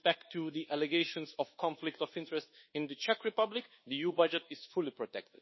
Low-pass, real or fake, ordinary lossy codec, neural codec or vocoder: 7.2 kHz; fake; MP3, 24 kbps; codec, 24 kHz, 1.2 kbps, DualCodec